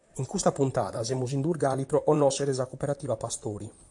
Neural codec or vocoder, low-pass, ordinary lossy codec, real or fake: vocoder, 44.1 kHz, 128 mel bands, Pupu-Vocoder; 10.8 kHz; AAC, 64 kbps; fake